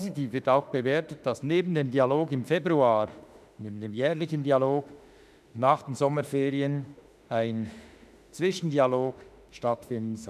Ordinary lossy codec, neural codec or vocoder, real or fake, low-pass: none; autoencoder, 48 kHz, 32 numbers a frame, DAC-VAE, trained on Japanese speech; fake; 14.4 kHz